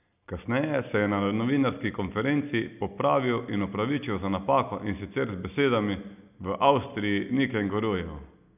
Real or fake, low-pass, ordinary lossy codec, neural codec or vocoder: real; 3.6 kHz; none; none